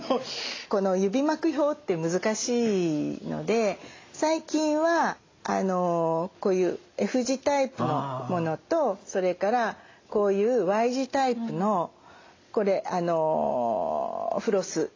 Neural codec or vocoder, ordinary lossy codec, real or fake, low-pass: none; AAC, 32 kbps; real; 7.2 kHz